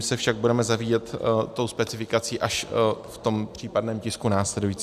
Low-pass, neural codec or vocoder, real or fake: 14.4 kHz; none; real